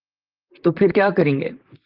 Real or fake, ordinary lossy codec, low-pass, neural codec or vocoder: fake; Opus, 32 kbps; 5.4 kHz; vocoder, 44.1 kHz, 128 mel bands, Pupu-Vocoder